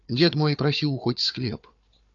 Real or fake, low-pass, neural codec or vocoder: fake; 7.2 kHz; codec, 16 kHz, 4 kbps, FunCodec, trained on Chinese and English, 50 frames a second